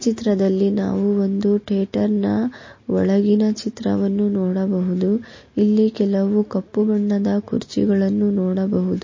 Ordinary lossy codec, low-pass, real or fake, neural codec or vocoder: MP3, 32 kbps; 7.2 kHz; real; none